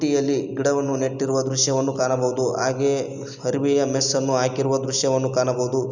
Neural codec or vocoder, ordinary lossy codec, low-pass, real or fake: none; none; 7.2 kHz; real